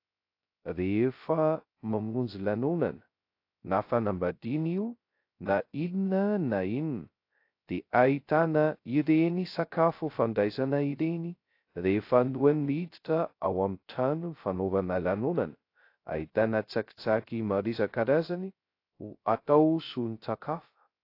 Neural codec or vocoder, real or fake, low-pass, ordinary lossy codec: codec, 16 kHz, 0.2 kbps, FocalCodec; fake; 5.4 kHz; AAC, 32 kbps